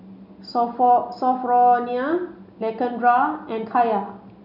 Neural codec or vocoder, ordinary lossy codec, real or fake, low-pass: none; none; real; 5.4 kHz